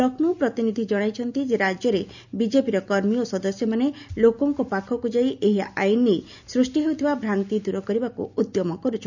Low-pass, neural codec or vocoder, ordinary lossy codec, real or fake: 7.2 kHz; none; none; real